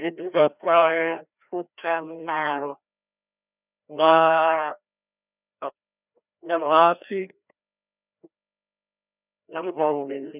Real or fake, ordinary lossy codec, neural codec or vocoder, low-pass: fake; none; codec, 16 kHz, 1 kbps, FreqCodec, larger model; 3.6 kHz